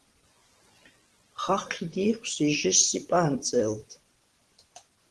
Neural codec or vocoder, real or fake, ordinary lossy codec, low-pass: vocoder, 24 kHz, 100 mel bands, Vocos; fake; Opus, 16 kbps; 10.8 kHz